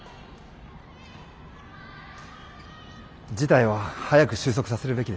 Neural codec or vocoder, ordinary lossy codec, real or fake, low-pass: none; none; real; none